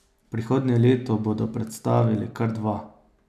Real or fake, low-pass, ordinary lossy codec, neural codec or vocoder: real; 14.4 kHz; none; none